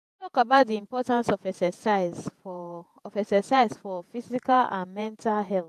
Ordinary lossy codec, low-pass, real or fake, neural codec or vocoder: AAC, 96 kbps; 14.4 kHz; fake; vocoder, 48 kHz, 128 mel bands, Vocos